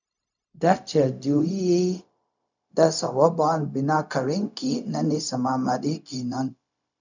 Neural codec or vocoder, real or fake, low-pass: codec, 16 kHz, 0.4 kbps, LongCat-Audio-Codec; fake; 7.2 kHz